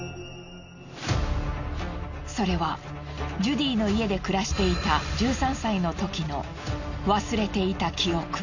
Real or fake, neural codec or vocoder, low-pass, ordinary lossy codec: real; none; 7.2 kHz; none